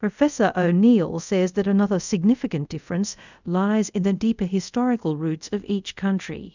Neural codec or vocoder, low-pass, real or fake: codec, 24 kHz, 0.5 kbps, DualCodec; 7.2 kHz; fake